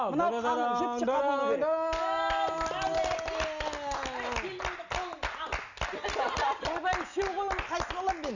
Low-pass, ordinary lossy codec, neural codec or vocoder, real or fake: 7.2 kHz; none; none; real